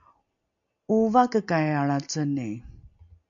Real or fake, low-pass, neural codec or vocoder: real; 7.2 kHz; none